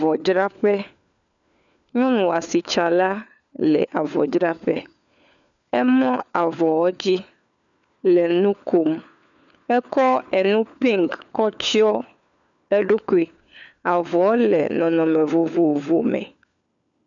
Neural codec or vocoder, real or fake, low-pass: codec, 16 kHz, 4 kbps, FunCodec, trained on LibriTTS, 50 frames a second; fake; 7.2 kHz